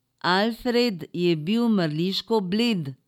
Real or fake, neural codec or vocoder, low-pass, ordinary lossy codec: real; none; 19.8 kHz; none